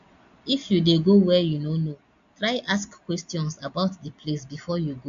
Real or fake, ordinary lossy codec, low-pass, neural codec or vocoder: real; AAC, 64 kbps; 7.2 kHz; none